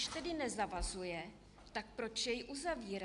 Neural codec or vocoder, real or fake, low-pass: none; real; 10.8 kHz